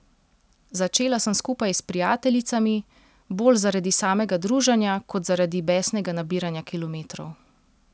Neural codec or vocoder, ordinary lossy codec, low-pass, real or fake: none; none; none; real